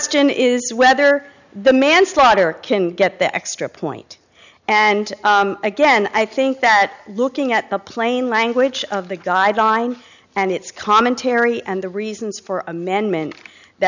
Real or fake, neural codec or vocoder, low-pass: real; none; 7.2 kHz